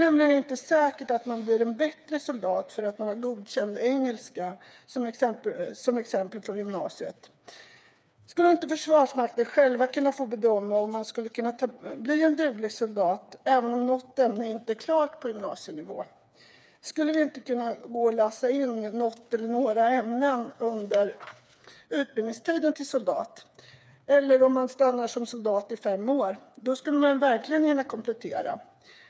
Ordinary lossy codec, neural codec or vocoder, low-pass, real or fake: none; codec, 16 kHz, 4 kbps, FreqCodec, smaller model; none; fake